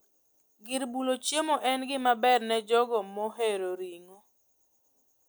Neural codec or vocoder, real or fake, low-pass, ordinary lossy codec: none; real; none; none